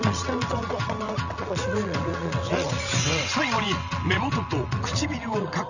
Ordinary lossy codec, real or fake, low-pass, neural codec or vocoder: none; fake; 7.2 kHz; vocoder, 22.05 kHz, 80 mel bands, WaveNeXt